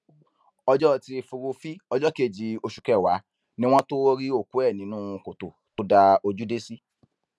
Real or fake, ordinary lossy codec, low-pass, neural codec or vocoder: real; none; none; none